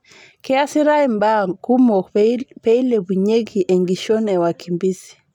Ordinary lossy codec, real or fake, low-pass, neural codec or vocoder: none; real; 19.8 kHz; none